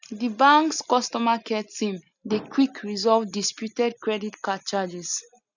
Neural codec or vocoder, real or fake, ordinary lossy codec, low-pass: none; real; none; 7.2 kHz